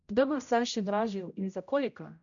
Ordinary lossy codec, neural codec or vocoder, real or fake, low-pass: none; codec, 16 kHz, 0.5 kbps, X-Codec, HuBERT features, trained on general audio; fake; 7.2 kHz